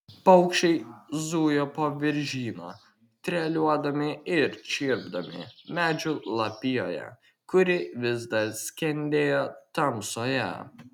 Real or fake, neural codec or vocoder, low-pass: real; none; 19.8 kHz